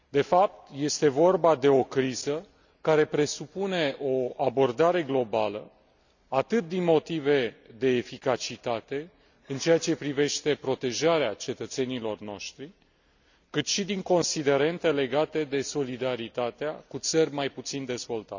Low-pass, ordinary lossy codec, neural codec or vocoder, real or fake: 7.2 kHz; none; none; real